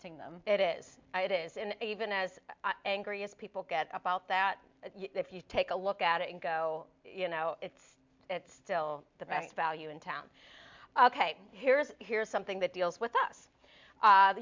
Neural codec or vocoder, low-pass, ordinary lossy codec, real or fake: none; 7.2 kHz; MP3, 64 kbps; real